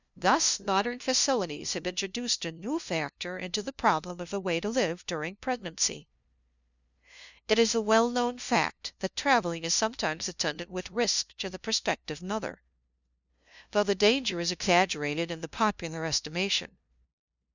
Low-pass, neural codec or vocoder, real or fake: 7.2 kHz; codec, 16 kHz, 0.5 kbps, FunCodec, trained on LibriTTS, 25 frames a second; fake